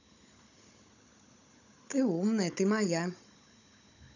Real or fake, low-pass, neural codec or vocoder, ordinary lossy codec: fake; 7.2 kHz; codec, 16 kHz, 16 kbps, FunCodec, trained on Chinese and English, 50 frames a second; none